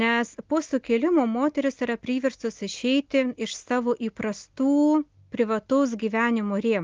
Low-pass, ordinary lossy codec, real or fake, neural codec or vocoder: 7.2 kHz; Opus, 32 kbps; real; none